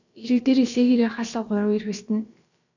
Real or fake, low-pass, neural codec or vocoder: fake; 7.2 kHz; codec, 16 kHz, about 1 kbps, DyCAST, with the encoder's durations